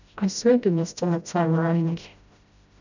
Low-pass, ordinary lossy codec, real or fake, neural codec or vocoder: 7.2 kHz; none; fake; codec, 16 kHz, 0.5 kbps, FreqCodec, smaller model